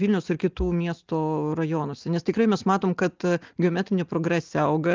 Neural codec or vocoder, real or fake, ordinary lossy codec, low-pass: none; real; Opus, 32 kbps; 7.2 kHz